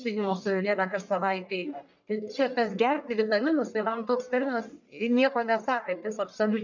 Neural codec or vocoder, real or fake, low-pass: codec, 44.1 kHz, 1.7 kbps, Pupu-Codec; fake; 7.2 kHz